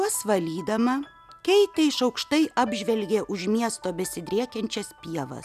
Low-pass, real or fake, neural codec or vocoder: 14.4 kHz; real; none